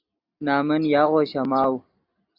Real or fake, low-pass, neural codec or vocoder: real; 5.4 kHz; none